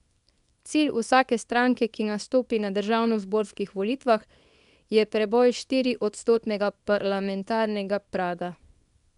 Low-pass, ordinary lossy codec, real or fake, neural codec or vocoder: 10.8 kHz; none; fake; codec, 24 kHz, 0.9 kbps, WavTokenizer, small release